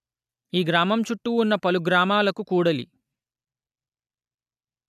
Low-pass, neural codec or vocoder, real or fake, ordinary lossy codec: 14.4 kHz; none; real; none